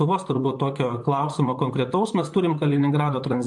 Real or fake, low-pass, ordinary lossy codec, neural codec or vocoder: fake; 10.8 kHz; MP3, 64 kbps; vocoder, 44.1 kHz, 128 mel bands, Pupu-Vocoder